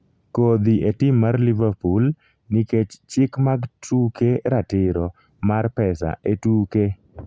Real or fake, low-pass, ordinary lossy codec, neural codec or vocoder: real; none; none; none